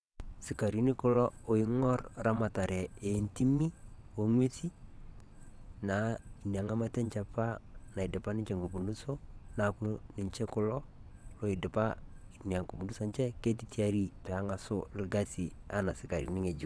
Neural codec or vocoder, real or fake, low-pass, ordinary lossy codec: vocoder, 22.05 kHz, 80 mel bands, WaveNeXt; fake; none; none